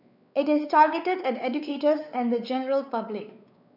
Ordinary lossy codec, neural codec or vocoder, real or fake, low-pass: none; codec, 16 kHz, 4 kbps, X-Codec, WavLM features, trained on Multilingual LibriSpeech; fake; 5.4 kHz